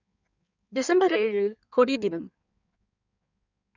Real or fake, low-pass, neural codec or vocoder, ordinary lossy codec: fake; 7.2 kHz; codec, 16 kHz in and 24 kHz out, 1.1 kbps, FireRedTTS-2 codec; none